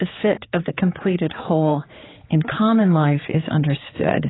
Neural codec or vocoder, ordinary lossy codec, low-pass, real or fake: codec, 16 kHz, 4 kbps, X-Codec, HuBERT features, trained on general audio; AAC, 16 kbps; 7.2 kHz; fake